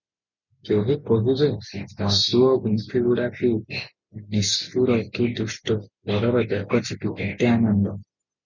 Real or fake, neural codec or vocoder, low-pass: real; none; 7.2 kHz